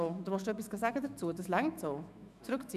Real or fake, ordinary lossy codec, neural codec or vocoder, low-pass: fake; none; autoencoder, 48 kHz, 128 numbers a frame, DAC-VAE, trained on Japanese speech; 14.4 kHz